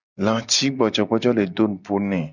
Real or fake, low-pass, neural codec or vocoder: real; 7.2 kHz; none